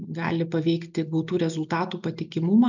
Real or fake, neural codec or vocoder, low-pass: real; none; 7.2 kHz